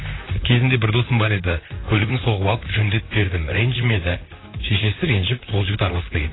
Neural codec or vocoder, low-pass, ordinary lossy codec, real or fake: vocoder, 44.1 kHz, 128 mel bands, Pupu-Vocoder; 7.2 kHz; AAC, 16 kbps; fake